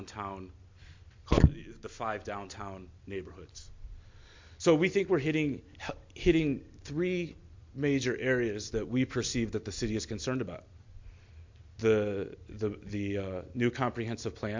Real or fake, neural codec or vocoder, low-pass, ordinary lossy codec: real; none; 7.2 kHz; MP3, 48 kbps